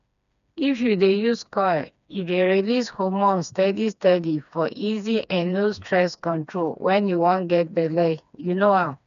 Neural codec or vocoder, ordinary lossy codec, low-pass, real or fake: codec, 16 kHz, 2 kbps, FreqCodec, smaller model; none; 7.2 kHz; fake